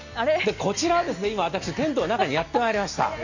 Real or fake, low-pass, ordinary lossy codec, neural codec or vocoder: real; 7.2 kHz; none; none